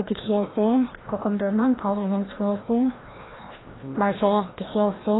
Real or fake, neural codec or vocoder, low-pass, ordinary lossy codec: fake; codec, 16 kHz, 1 kbps, FreqCodec, larger model; 7.2 kHz; AAC, 16 kbps